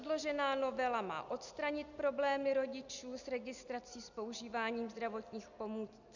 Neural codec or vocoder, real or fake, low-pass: none; real; 7.2 kHz